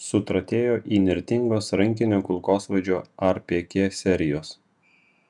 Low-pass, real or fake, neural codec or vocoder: 10.8 kHz; fake; vocoder, 48 kHz, 128 mel bands, Vocos